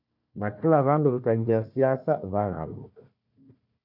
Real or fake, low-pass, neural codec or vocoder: fake; 5.4 kHz; codec, 16 kHz, 1 kbps, FunCodec, trained on Chinese and English, 50 frames a second